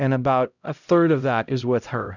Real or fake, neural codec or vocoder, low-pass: fake; codec, 16 kHz, 0.5 kbps, X-Codec, HuBERT features, trained on LibriSpeech; 7.2 kHz